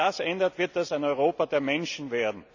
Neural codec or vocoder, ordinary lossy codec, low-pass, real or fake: none; none; 7.2 kHz; real